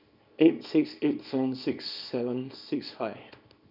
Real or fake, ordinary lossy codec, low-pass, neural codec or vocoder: fake; none; 5.4 kHz; codec, 24 kHz, 0.9 kbps, WavTokenizer, small release